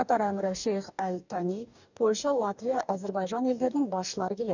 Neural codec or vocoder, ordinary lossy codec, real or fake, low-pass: codec, 44.1 kHz, 2.6 kbps, DAC; none; fake; 7.2 kHz